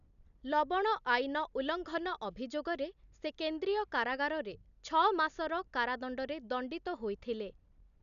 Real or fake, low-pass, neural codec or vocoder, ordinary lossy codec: real; 7.2 kHz; none; none